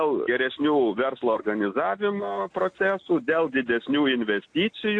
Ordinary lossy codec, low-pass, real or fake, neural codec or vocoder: AAC, 48 kbps; 9.9 kHz; real; none